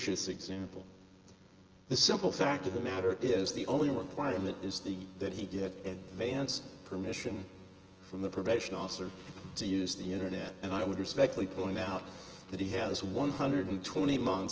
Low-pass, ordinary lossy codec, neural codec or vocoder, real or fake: 7.2 kHz; Opus, 16 kbps; vocoder, 24 kHz, 100 mel bands, Vocos; fake